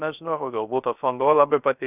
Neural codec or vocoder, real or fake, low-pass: codec, 16 kHz, 0.3 kbps, FocalCodec; fake; 3.6 kHz